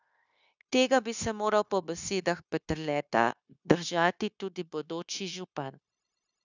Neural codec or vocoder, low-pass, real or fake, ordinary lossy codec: codec, 16 kHz, 0.9 kbps, LongCat-Audio-Codec; 7.2 kHz; fake; none